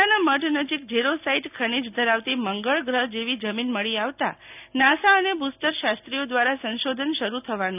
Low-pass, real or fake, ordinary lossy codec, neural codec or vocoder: 3.6 kHz; real; none; none